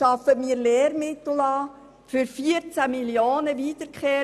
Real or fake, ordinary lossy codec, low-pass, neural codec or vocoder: real; none; none; none